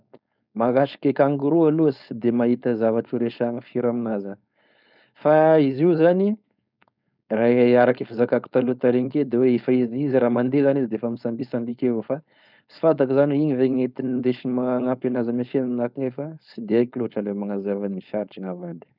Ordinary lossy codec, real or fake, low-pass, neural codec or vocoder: none; fake; 5.4 kHz; codec, 16 kHz, 4.8 kbps, FACodec